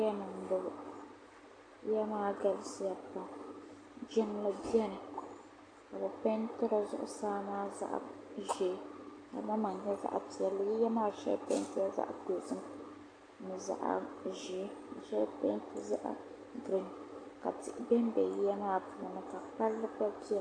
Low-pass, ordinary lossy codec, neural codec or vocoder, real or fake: 9.9 kHz; AAC, 48 kbps; none; real